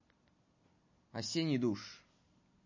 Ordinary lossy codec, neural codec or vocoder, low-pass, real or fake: MP3, 32 kbps; none; 7.2 kHz; real